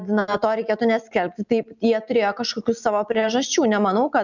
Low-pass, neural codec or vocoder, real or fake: 7.2 kHz; none; real